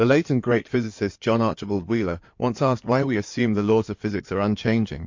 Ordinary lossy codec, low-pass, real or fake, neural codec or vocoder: MP3, 48 kbps; 7.2 kHz; fake; codec, 16 kHz in and 24 kHz out, 2.2 kbps, FireRedTTS-2 codec